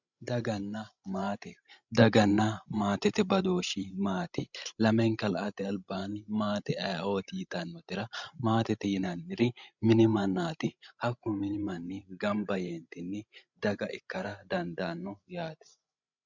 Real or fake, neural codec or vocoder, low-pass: fake; codec, 16 kHz, 16 kbps, FreqCodec, larger model; 7.2 kHz